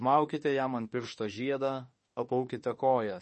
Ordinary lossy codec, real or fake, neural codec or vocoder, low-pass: MP3, 32 kbps; fake; autoencoder, 48 kHz, 32 numbers a frame, DAC-VAE, trained on Japanese speech; 10.8 kHz